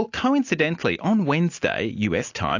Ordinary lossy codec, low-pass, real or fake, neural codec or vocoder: AAC, 48 kbps; 7.2 kHz; real; none